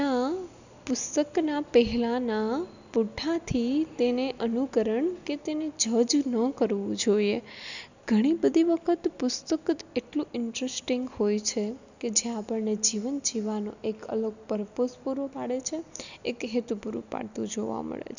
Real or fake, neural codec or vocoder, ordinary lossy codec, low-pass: real; none; none; 7.2 kHz